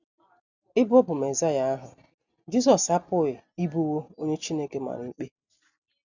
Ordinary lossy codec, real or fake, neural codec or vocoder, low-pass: none; real; none; 7.2 kHz